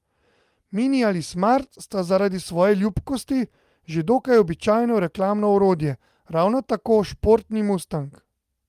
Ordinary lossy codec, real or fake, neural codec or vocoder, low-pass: Opus, 32 kbps; real; none; 14.4 kHz